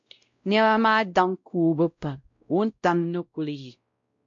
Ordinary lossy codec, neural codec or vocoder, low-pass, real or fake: MP3, 48 kbps; codec, 16 kHz, 0.5 kbps, X-Codec, WavLM features, trained on Multilingual LibriSpeech; 7.2 kHz; fake